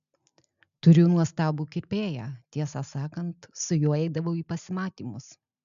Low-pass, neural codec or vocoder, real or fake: 7.2 kHz; none; real